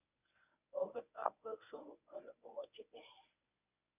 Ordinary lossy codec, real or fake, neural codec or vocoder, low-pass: none; fake; codec, 24 kHz, 0.9 kbps, WavTokenizer, medium speech release version 1; 3.6 kHz